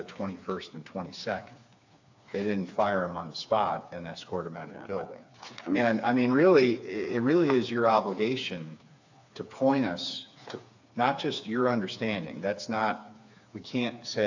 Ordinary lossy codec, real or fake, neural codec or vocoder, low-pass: AAC, 48 kbps; fake; codec, 16 kHz, 4 kbps, FreqCodec, smaller model; 7.2 kHz